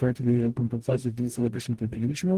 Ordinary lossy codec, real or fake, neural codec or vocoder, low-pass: Opus, 24 kbps; fake; codec, 44.1 kHz, 0.9 kbps, DAC; 14.4 kHz